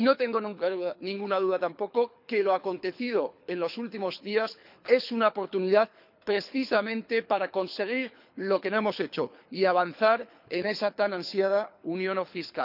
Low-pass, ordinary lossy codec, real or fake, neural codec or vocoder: 5.4 kHz; none; fake; codec, 24 kHz, 6 kbps, HILCodec